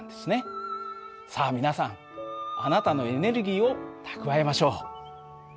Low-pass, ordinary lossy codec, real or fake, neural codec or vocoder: none; none; real; none